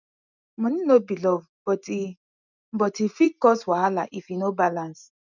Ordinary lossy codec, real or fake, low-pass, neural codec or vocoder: none; real; 7.2 kHz; none